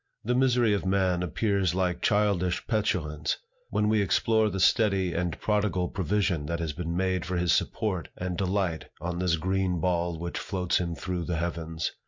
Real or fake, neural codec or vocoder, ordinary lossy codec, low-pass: real; none; MP3, 48 kbps; 7.2 kHz